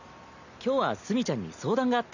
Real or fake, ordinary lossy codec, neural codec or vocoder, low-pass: real; none; none; 7.2 kHz